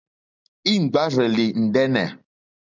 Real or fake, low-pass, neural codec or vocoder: real; 7.2 kHz; none